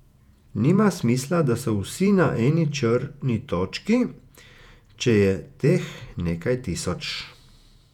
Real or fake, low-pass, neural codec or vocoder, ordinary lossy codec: fake; 19.8 kHz; vocoder, 48 kHz, 128 mel bands, Vocos; none